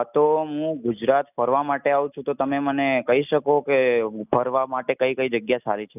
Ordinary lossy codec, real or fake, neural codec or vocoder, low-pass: none; real; none; 3.6 kHz